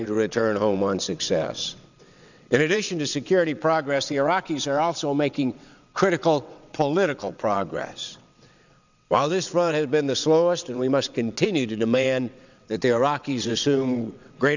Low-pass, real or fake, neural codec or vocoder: 7.2 kHz; fake; vocoder, 22.05 kHz, 80 mel bands, WaveNeXt